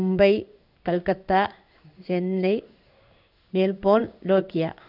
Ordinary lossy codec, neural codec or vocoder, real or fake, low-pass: none; codec, 16 kHz in and 24 kHz out, 1 kbps, XY-Tokenizer; fake; 5.4 kHz